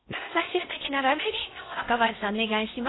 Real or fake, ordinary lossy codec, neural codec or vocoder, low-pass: fake; AAC, 16 kbps; codec, 16 kHz in and 24 kHz out, 0.6 kbps, FocalCodec, streaming, 4096 codes; 7.2 kHz